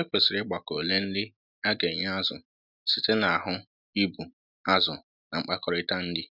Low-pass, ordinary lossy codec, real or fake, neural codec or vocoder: 5.4 kHz; none; real; none